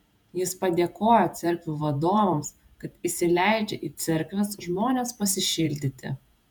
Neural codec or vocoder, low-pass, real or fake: vocoder, 48 kHz, 128 mel bands, Vocos; 19.8 kHz; fake